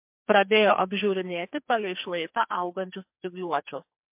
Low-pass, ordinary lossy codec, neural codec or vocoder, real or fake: 3.6 kHz; MP3, 32 kbps; codec, 44.1 kHz, 2.6 kbps, SNAC; fake